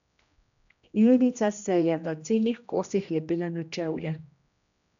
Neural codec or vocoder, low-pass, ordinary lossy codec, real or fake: codec, 16 kHz, 1 kbps, X-Codec, HuBERT features, trained on general audio; 7.2 kHz; none; fake